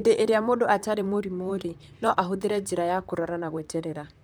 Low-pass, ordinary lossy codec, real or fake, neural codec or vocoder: none; none; fake; vocoder, 44.1 kHz, 128 mel bands, Pupu-Vocoder